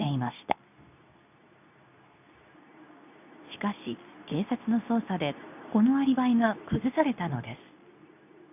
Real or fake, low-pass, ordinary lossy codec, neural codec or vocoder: fake; 3.6 kHz; none; codec, 24 kHz, 0.9 kbps, WavTokenizer, medium speech release version 2